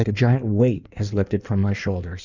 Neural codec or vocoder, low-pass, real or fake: codec, 16 kHz in and 24 kHz out, 1.1 kbps, FireRedTTS-2 codec; 7.2 kHz; fake